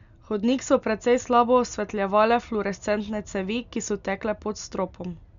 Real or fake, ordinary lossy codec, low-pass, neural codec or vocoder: real; none; 7.2 kHz; none